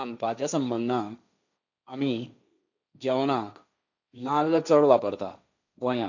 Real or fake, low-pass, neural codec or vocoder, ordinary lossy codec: fake; 7.2 kHz; codec, 16 kHz, 1.1 kbps, Voila-Tokenizer; none